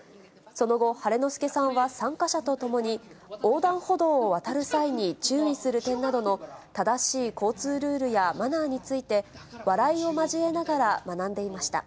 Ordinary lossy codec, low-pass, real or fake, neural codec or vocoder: none; none; real; none